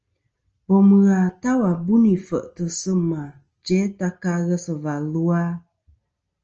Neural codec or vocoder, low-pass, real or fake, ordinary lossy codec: none; 7.2 kHz; real; Opus, 24 kbps